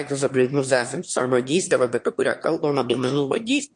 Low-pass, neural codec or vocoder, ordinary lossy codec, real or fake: 9.9 kHz; autoencoder, 22.05 kHz, a latent of 192 numbers a frame, VITS, trained on one speaker; MP3, 48 kbps; fake